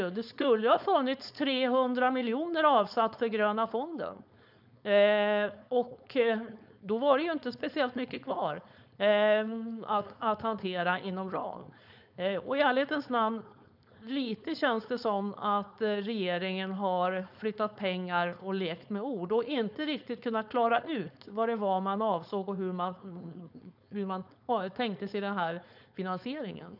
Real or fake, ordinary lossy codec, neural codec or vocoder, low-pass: fake; none; codec, 16 kHz, 4.8 kbps, FACodec; 5.4 kHz